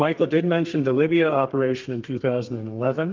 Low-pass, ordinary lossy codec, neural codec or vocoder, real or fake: 7.2 kHz; Opus, 24 kbps; codec, 32 kHz, 1.9 kbps, SNAC; fake